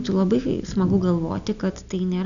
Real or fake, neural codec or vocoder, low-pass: real; none; 7.2 kHz